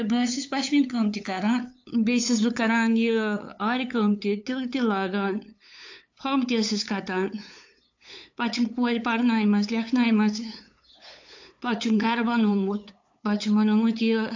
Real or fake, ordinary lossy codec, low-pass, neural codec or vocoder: fake; AAC, 48 kbps; 7.2 kHz; codec, 16 kHz, 8 kbps, FunCodec, trained on LibriTTS, 25 frames a second